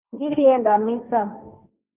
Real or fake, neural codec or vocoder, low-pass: fake; codec, 16 kHz, 1.1 kbps, Voila-Tokenizer; 3.6 kHz